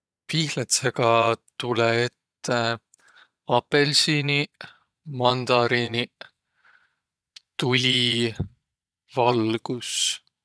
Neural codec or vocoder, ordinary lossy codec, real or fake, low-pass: vocoder, 22.05 kHz, 80 mel bands, Vocos; none; fake; none